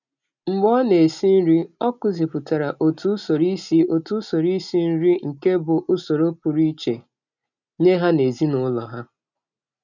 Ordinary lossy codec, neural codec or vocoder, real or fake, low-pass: none; none; real; 7.2 kHz